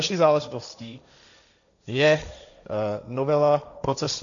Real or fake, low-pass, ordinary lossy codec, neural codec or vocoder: fake; 7.2 kHz; MP3, 96 kbps; codec, 16 kHz, 1.1 kbps, Voila-Tokenizer